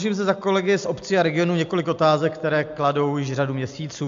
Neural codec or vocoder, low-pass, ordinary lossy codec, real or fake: none; 7.2 kHz; AAC, 64 kbps; real